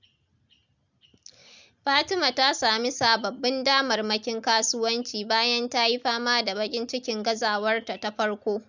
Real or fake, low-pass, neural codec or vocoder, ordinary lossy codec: real; 7.2 kHz; none; none